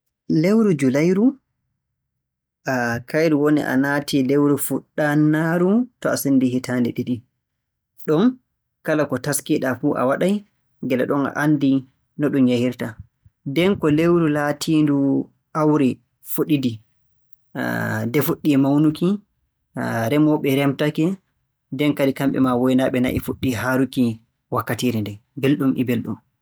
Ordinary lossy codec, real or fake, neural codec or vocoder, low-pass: none; real; none; none